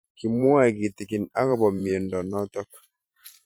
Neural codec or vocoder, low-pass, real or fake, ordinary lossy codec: vocoder, 44.1 kHz, 128 mel bands every 512 samples, BigVGAN v2; none; fake; none